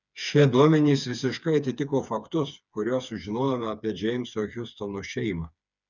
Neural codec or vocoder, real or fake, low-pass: codec, 16 kHz, 4 kbps, FreqCodec, smaller model; fake; 7.2 kHz